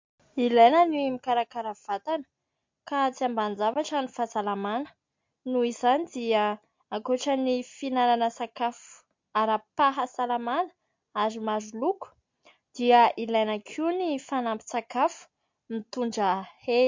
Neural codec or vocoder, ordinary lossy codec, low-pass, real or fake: none; MP3, 48 kbps; 7.2 kHz; real